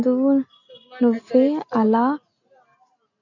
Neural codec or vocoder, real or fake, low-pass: none; real; 7.2 kHz